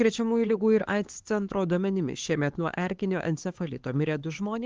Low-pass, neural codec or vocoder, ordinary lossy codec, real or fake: 7.2 kHz; codec, 16 kHz, 4 kbps, X-Codec, HuBERT features, trained on LibriSpeech; Opus, 16 kbps; fake